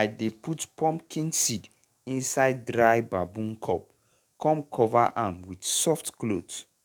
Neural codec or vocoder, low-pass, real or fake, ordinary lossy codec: codec, 44.1 kHz, 7.8 kbps, DAC; 19.8 kHz; fake; none